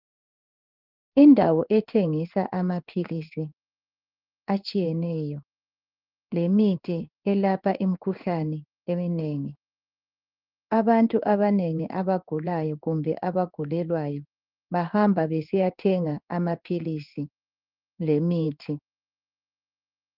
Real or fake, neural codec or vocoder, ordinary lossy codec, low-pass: fake; codec, 16 kHz in and 24 kHz out, 1 kbps, XY-Tokenizer; Opus, 32 kbps; 5.4 kHz